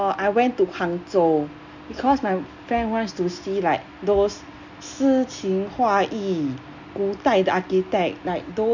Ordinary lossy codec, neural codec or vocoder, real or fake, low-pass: none; none; real; 7.2 kHz